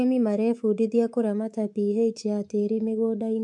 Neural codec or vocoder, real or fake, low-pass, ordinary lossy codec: codec, 24 kHz, 3.1 kbps, DualCodec; fake; 10.8 kHz; MP3, 64 kbps